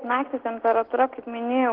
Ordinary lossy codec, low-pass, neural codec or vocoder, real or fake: Opus, 16 kbps; 5.4 kHz; none; real